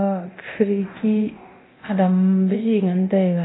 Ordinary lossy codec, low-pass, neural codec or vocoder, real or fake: AAC, 16 kbps; 7.2 kHz; codec, 24 kHz, 0.9 kbps, DualCodec; fake